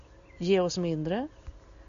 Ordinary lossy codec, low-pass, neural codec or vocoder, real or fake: MP3, 64 kbps; 7.2 kHz; none; real